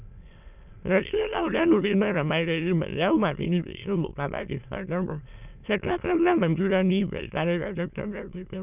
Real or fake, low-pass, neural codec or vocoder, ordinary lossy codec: fake; 3.6 kHz; autoencoder, 22.05 kHz, a latent of 192 numbers a frame, VITS, trained on many speakers; none